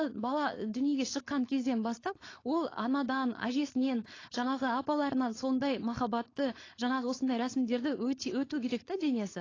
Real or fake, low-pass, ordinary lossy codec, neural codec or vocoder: fake; 7.2 kHz; AAC, 32 kbps; codec, 16 kHz, 4.8 kbps, FACodec